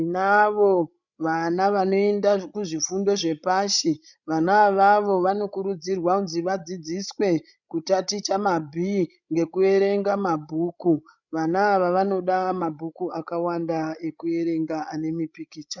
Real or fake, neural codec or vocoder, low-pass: fake; codec, 16 kHz, 16 kbps, FreqCodec, larger model; 7.2 kHz